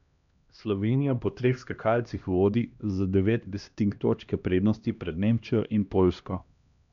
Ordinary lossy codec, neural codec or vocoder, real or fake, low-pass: none; codec, 16 kHz, 1 kbps, X-Codec, HuBERT features, trained on LibriSpeech; fake; 7.2 kHz